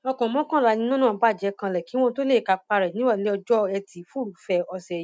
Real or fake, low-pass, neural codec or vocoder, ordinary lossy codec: real; none; none; none